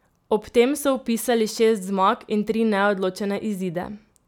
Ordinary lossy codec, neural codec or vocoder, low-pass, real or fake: none; none; 19.8 kHz; real